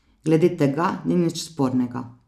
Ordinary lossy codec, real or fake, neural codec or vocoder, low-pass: none; real; none; 14.4 kHz